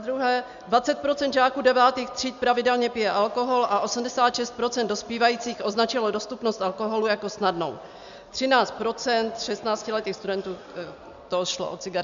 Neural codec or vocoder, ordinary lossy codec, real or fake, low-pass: none; AAC, 96 kbps; real; 7.2 kHz